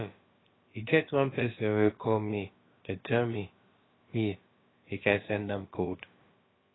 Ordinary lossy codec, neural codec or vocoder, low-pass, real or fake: AAC, 16 kbps; codec, 16 kHz, about 1 kbps, DyCAST, with the encoder's durations; 7.2 kHz; fake